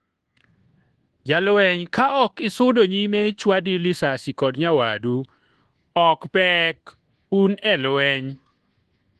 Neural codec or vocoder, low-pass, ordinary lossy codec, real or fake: codec, 24 kHz, 1.2 kbps, DualCodec; 10.8 kHz; Opus, 16 kbps; fake